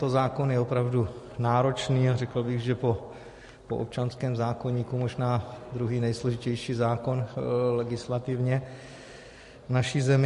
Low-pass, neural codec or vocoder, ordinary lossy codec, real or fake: 14.4 kHz; none; MP3, 48 kbps; real